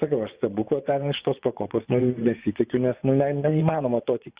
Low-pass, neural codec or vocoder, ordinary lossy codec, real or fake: 3.6 kHz; vocoder, 24 kHz, 100 mel bands, Vocos; Opus, 64 kbps; fake